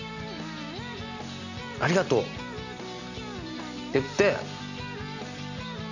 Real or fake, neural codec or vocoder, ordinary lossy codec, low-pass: real; none; none; 7.2 kHz